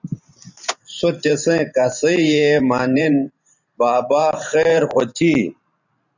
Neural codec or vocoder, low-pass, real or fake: vocoder, 44.1 kHz, 128 mel bands every 512 samples, BigVGAN v2; 7.2 kHz; fake